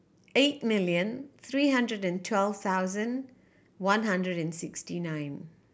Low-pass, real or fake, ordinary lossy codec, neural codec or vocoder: none; real; none; none